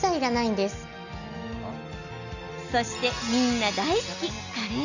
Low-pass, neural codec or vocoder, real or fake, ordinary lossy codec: 7.2 kHz; none; real; none